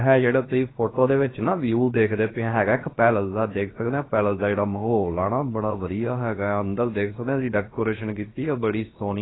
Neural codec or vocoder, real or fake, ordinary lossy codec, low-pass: codec, 16 kHz, about 1 kbps, DyCAST, with the encoder's durations; fake; AAC, 16 kbps; 7.2 kHz